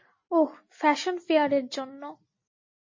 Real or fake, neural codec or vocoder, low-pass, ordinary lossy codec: real; none; 7.2 kHz; MP3, 32 kbps